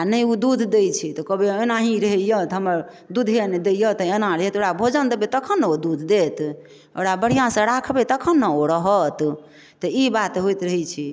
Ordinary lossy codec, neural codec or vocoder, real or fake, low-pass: none; none; real; none